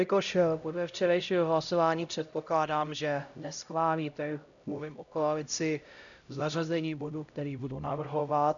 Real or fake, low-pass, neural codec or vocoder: fake; 7.2 kHz; codec, 16 kHz, 0.5 kbps, X-Codec, HuBERT features, trained on LibriSpeech